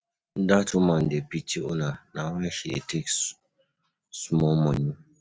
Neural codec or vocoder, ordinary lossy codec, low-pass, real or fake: none; none; none; real